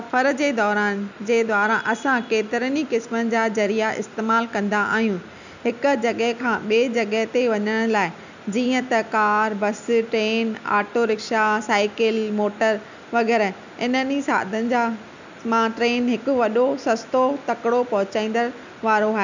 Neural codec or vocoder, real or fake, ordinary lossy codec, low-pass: none; real; none; 7.2 kHz